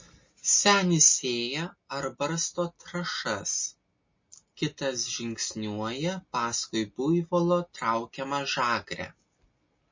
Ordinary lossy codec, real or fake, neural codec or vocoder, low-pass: MP3, 32 kbps; real; none; 7.2 kHz